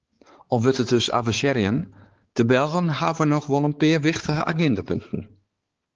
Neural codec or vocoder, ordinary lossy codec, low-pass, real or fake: codec, 16 kHz, 4 kbps, X-Codec, HuBERT features, trained on balanced general audio; Opus, 16 kbps; 7.2 kHz; fake